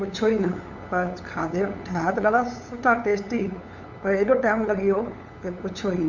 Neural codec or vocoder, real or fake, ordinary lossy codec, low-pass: codec, 16 kHz, 8 kbps, FunCodec, trained on LibriTTS, 25 frames a second; fake; Opus, 64 kbps; 7.2 kHz